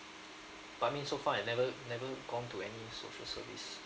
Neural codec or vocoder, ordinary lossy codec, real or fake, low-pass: none; none; real; none